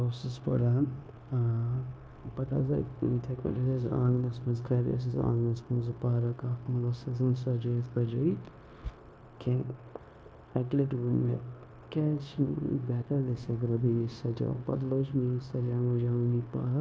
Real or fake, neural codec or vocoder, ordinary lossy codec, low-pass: fake; codec, 16 kHz, 0.9 kbps, LongCat-Audio-Codec; none; none